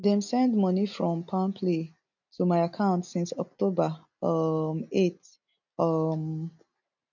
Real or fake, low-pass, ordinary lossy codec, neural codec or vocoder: real; 7.2 kHz; none; none